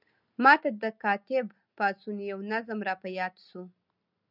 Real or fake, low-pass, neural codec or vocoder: real; 5.4 kHz; none